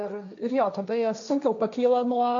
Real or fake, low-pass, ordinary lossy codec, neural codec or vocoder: fake; 7.2 kHz; MP3, 64 kbps; codec, 16 kHz, 1.1 kbps, Voila-Tokenizer